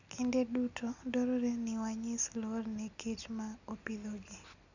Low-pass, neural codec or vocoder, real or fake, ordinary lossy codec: 7.2 kHz; none; real; none